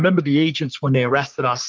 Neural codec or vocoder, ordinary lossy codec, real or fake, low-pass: codec, 44.1 kHz, 3.4 kbps, Pupu-Codec; Opus, 24 kbps; fake; 7.2 kHz